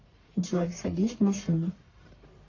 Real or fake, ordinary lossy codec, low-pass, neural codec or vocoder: fake; AAC, 32 kbps; 7.2 kHz; codec, 44.1 kHz, 1.7 kbps, Pupu-Codec